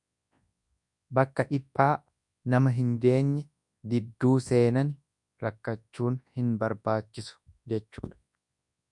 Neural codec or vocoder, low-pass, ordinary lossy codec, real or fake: codec, 24 kHz, 0.9 kbps, WavTokenizer, large speech release; 10.8 kHz; MP3, 96 kbps; fake